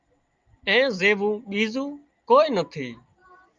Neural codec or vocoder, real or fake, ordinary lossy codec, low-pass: none; real; Opus, 24 kbps; 7.2 kHz